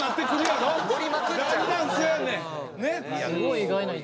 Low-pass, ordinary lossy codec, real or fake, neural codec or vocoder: none; none; real; none